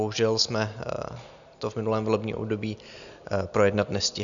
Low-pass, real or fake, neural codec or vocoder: 7.2 kHz; real; none